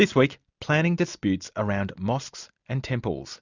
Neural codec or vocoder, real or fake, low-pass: none; real; 7.2 kHz